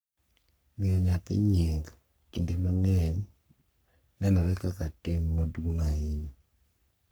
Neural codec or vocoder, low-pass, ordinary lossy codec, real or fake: codec, 44.1 kHz, 3.4 kbps, Pupu-Codec; none; none; fake